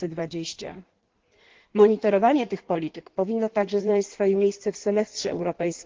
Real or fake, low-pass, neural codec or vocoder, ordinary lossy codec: fake; 7.2 kHz; codec, 16 kHz in and 24 kHz out, 1.1 kbps, FireRedTTS-2 codec; Opus, 16 kbps